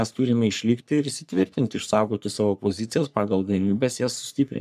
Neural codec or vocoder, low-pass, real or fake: codec, 44.1 kHz, 3.4 kbps, Pupu-Codec; 14.4 kHz; fake